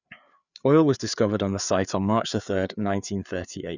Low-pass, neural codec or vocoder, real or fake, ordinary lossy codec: 7.2 kHz; codec, 16 kHz, 4 kbps, FreqCodec, larger model; fake; none